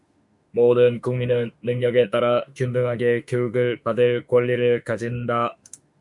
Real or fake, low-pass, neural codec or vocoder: fake; 10.8 kHz; autoencoder, 48 kHz, 32 numbers a frame, DAC-VAE, trained on Japanese speech